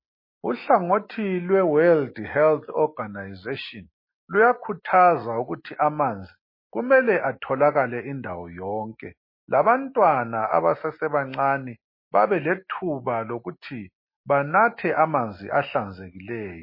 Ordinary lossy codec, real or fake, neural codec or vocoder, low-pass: MP3, 24 kbps; real; none; 5.4 kHz